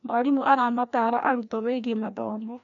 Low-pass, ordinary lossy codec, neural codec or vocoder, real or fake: 7.2 kHz; none; codec, 16 kHz, 1 kbps, FreqCodec, larger model; fake